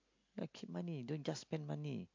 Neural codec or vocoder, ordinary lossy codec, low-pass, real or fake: none; MP3, 48 kbps; 7.2 kHz; real